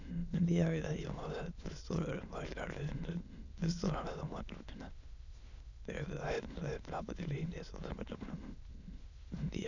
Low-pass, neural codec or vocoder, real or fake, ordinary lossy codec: 7.2 kHz; autoencoder, 22.05 kHz, a latent of 192 numbers a frame, VITS, trained on many speakers; fake; none